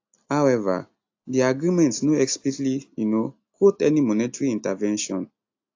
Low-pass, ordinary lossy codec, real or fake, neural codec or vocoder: 7.2 kHz; AAC, 48 kbps; real; none